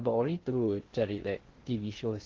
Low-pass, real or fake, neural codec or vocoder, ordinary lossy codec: 7.2 kHz; fake; codec, 16 kHz in and 24 kHz out, 0.6 kbps, FocalCodec, streaming, 2048 codes; Opus, 16 kbps